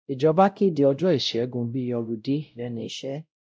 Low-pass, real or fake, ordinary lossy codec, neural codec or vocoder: none; fake; none; codec, 16 kHz, 0.5 kbps, X-Codec, WavLM features, trained on Multilingual LibriSpeech